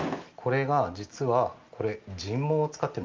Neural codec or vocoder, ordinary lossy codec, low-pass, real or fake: none; Opus, 32 kbps; 7.2 kHz; real